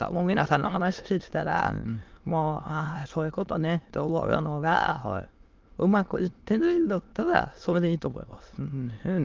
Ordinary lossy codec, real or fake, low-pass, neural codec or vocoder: Opus, 24 kbps; fake; 7.2 kHz; autoencoder, 22.05 kHz, a latent of 192 numbers a frame, VITS, trained on many speakers